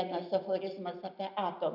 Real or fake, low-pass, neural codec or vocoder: real; 5.4 kHz; none